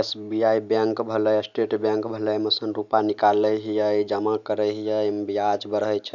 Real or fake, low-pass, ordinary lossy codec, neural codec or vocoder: real; 7.2 kHz; none; none